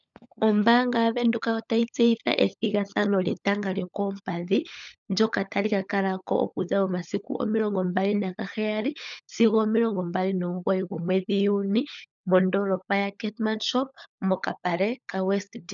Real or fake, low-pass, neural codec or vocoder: fake; 7.2 kHz; codec, 16 kHz, 16 kbps, FunCodec, trained on LibriTTS, 50 frames a second